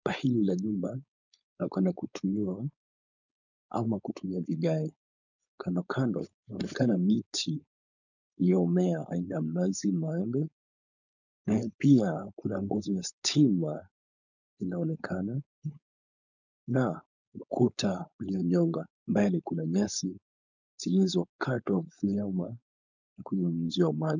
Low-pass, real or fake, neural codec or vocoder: 7.2 kHz; fake; codec, 16 kHz, 4.8 kbps, FACodec